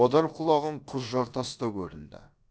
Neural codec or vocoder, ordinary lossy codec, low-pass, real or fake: codec, 16 kHz, about 1 kbps, DyCAST, with the encoder's durations; none; none; fake